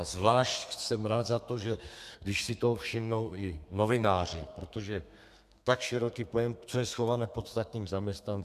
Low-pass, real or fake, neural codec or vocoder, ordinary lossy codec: 14.4 kHz; fake; codec, 32 kHz, 1.9 kbps, SNAC; MP3, 96 kbps